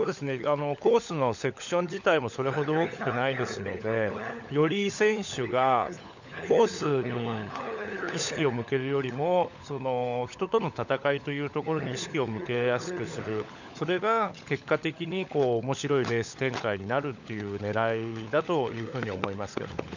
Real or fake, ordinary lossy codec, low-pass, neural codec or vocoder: fake; none; 7.2 kHz; codec, 16 kHz, 16 kbps, FunCodec, trained on LibriTTS, 50 frames a second